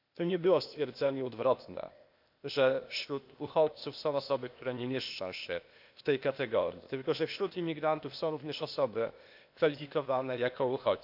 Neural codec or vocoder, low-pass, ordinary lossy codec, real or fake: codec, 16 kHz, 0.8 kbps, ZipCodec; 5.4 kHz; none; fake